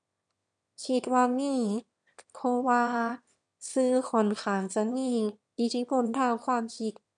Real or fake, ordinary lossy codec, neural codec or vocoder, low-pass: fake; none; autoencoder, 22.05 kHz, a latent of 192 numbers a frame, VITS, trained on one speaker; 9.9 kHz